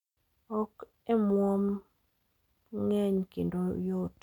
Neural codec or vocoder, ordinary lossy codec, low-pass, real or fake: none; none; 19.8 kHz; real